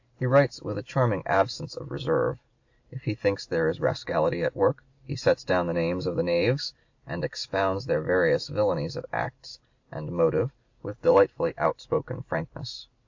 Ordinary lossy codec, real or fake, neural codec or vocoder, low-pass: AAC, 48 kbps; real; none; 7.2 kHz